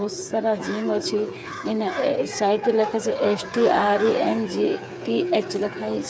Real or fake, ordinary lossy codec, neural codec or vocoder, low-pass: fake; none; codec, 16 kHz, 8 kbps, FreqCodec, smaller model; none